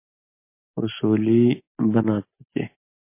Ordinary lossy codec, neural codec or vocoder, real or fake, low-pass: MP3, 24 kbps; none; real; 3.6 kHz